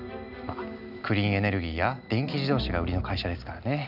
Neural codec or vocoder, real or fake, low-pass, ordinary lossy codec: none; real; 5.4 kHz; none